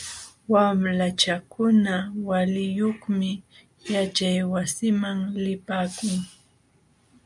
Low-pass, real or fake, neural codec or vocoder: 10.8 kHz; real; none